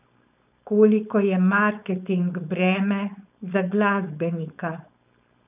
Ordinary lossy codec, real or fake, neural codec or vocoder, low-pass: none; fake; codec, 16 kHz, 4.8 kbps, FACodec; 3.6 kHz